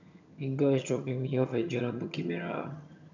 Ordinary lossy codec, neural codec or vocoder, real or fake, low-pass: none; vocoder, 22.05 kHz, 80 mel bands, HiFi-GAN; fake; 7.2 kHz